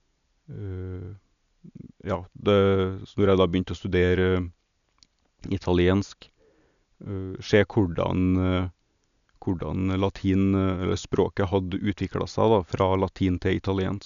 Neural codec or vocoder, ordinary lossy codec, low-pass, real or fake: none; none; 7.2 kHz; real